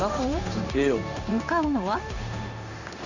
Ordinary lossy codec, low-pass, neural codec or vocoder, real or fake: none; 7.2 kHz; codec, 16 kHz in and 24 kHz out, 1 kbps, XY-Tokenizer; fake